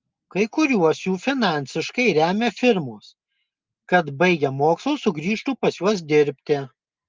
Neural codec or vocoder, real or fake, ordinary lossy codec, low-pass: none; real; Opus, 24 kbps; 7.2 kHz